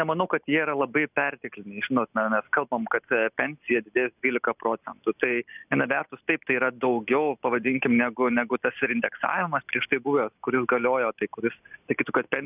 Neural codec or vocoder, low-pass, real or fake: none; 3.6 kHz; real